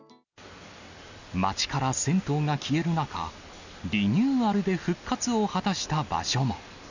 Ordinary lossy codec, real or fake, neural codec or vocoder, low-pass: none; real; none; 7.2 kHz